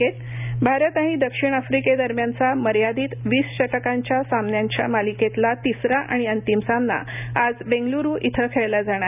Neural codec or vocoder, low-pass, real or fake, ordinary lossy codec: none; 3.6 kHz; real; none